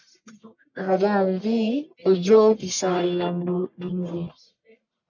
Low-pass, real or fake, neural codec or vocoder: 7.2 kHz; fake; codec, 44.1 kHz, 1.7 kbps, Pupu-Codec